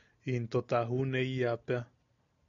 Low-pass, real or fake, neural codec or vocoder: 7.2 kHz; real; none